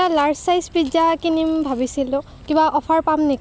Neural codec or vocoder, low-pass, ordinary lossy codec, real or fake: none; none; none; real